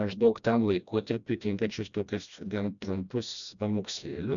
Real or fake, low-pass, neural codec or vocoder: fake; 7.2 kHz; codec, 16 kHz, 1 kbps, FreqCodec, smaller model